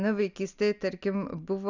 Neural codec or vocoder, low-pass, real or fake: none; 7.2 kHz; real